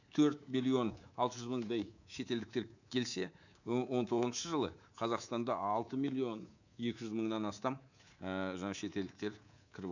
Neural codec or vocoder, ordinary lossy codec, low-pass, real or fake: codec, 24 kHz, 3.1 kbps, DualCodec; none; 7.2 kHz; fake